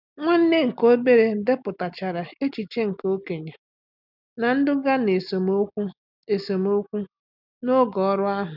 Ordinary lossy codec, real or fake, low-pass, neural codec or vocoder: none; real; 5.4 kHz; none